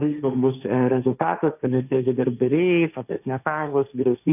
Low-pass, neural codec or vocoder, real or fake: 3.6 kHz; codec, 16 kHz, 1.1 kbps, Voila-Tokenizer; fake